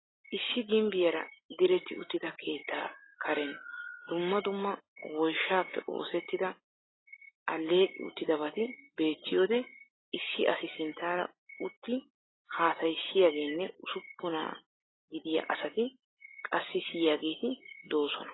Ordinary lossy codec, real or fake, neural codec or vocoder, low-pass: AAC, 16 kbps; real; none; 7.2 kHz